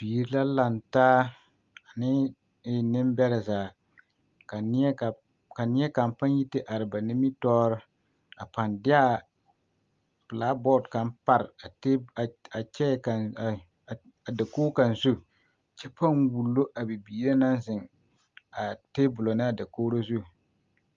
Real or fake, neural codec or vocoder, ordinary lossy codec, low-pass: real; none; Opus, 32 kbps; 7.2 kHz